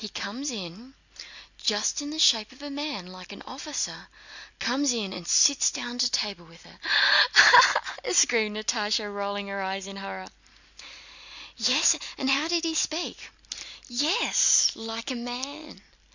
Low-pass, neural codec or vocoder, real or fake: 7.2 kHz; none; real